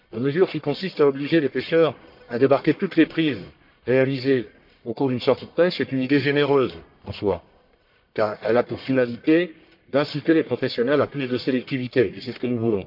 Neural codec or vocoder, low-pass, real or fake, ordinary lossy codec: codec, 44.1 kHz, 1.7 kbps, Pupu-Codec; 5.4 kHz; fake; MP3, 48 kbps